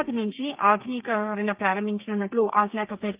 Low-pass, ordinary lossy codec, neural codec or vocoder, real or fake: 3.6 kHz; Opus, 24 kbps; codec, 32 kHz, 1.9 kbps, SNAC; fake